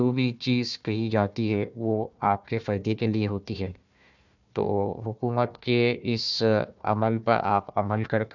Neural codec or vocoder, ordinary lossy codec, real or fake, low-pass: codec, 16 kHz, 1 kbps, FunCodec, trained on Chinese and English, 50 frames a second; none; fake; 7.2 kHz